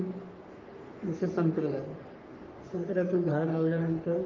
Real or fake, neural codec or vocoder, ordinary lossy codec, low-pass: fake; codec, 44.1 kHz, 3.4 kbps, Pupu-Codec; Opus, 32 kbps; 7.2 kHz